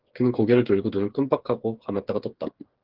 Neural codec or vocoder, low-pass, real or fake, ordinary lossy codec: codec, 16 kHz, 8 kbps, FreqCodec, smaller model; 5.4 kHz; fake; Opus, 16 kbps